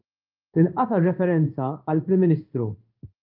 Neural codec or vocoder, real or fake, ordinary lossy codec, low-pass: codec, 16 kHz in and 24 kHz out, 1 kbps, XY-Tokenizer; fake; Opus, 32 kbps; 5.4 kHz